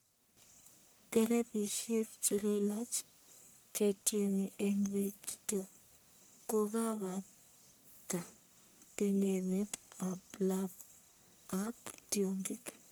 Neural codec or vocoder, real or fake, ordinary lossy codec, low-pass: codec, 44.1 kHz, 1.7 kbps, Pupu-Codec; fake; none; none